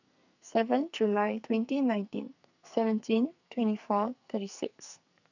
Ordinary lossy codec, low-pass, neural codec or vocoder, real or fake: none; 7.2 kHz; codec, 44.1 kHz, 2.6 kbps, SNAC; fake